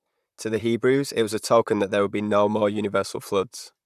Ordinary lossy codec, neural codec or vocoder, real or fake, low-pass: none; vocoder, 44.1 kHz, 128 mel bands, Pupu-Vocoder; fake; 14.4 kHz